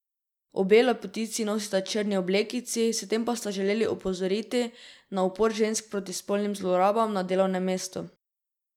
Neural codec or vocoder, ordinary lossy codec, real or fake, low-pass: none; none; real; 19.8 kHz